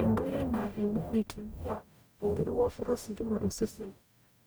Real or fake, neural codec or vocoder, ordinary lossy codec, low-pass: fake; codec, 44.1 kHz, 0.9 kbps, DAC; none; none